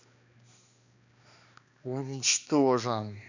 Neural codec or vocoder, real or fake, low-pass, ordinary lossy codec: codec, 16 kHz, 2 kbps, X-Codec, HuBERT features, trained on balanced general audio; fake; 7.2 kHz; none